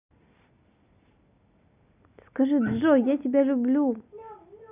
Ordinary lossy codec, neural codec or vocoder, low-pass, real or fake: none; none; 3.6 kHz; real